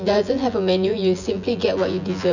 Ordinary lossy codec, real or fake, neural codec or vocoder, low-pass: none; fake; vocoder, 24 kHz, 100 mel bands, Vocos; 7.2 kHz